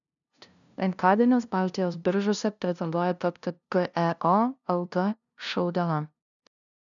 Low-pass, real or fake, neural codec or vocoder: 7.2 kHz; fake; codec, 16 kHz, 0.5 kbps, FunCodec, trained on LibriTTS, 25 frames a second